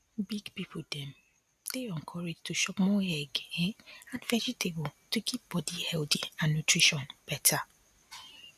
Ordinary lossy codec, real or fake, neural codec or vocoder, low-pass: none; real; none; 14.4 kHz